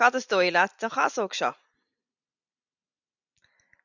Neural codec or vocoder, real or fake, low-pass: none; real; 7.2 kHz